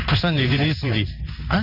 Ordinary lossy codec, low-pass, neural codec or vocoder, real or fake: none; 5.4 kHz; codec, 16 kHz in and 24 kHz out, 1 kbps, XY-Tokenizer; fake